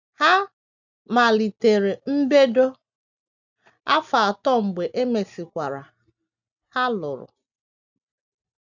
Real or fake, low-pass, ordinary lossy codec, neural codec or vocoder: real; 7.2 kHz; none; none